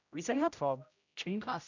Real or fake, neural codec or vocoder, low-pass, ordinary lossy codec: fake; codec, 16 kHz, 0.5 kbps, X-Codec, HuBERT features, trained on general audio; 7.2 kHz; none